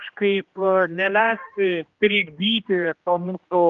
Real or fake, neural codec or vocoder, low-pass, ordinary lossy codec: fake; codec, 16 kHz, 1 kbps, X-Codec, HuBERT features, trained on general audio; 7.2 kHz; Opus, 32 kbps